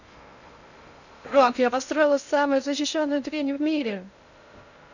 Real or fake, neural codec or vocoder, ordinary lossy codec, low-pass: fake; codec, 16 kHz in and 24 kHz out, 0.6 kbps, FocalCodec, streaming, 2048 codes; none; 7.2 kHz